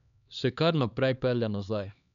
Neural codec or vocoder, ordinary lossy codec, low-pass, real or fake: codec, 16 kHz, 2 kbps, X-Codec, HuBERT features, trained on LibriSpeech; none; 7.2 kHz; fake